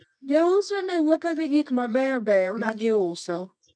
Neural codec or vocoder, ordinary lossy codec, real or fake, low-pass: codec, 24 kHz, 0.9 kbps, WavTokenizer, medium music audio release; none; fake; 9.9 kHz